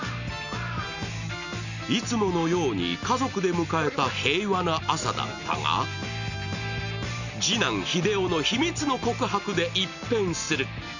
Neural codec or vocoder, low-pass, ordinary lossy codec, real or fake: none; 7.2 kHz; none; real